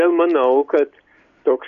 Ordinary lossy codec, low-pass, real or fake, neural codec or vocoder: MP3, 96 kbps; 7.2 kHz; real; none